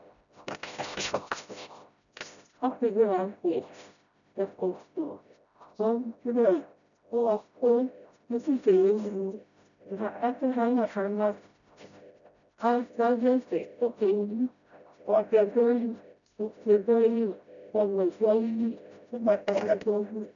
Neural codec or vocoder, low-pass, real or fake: codec, 16 kHz, 0.5 kbps, FreqCodec, smaller model; 7.2 kHz; fake